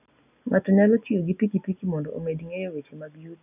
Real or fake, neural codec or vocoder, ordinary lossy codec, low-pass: real; none; none; 3.6 kHz